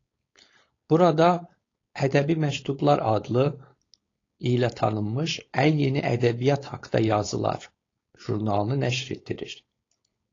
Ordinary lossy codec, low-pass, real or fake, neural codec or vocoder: AAC, 32 kbps; 7.2 kHz; fake; codec, 16 kHz, 4.8 kbps, FACodec